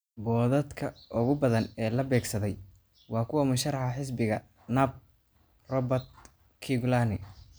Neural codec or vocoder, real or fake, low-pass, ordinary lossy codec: none; real; none; none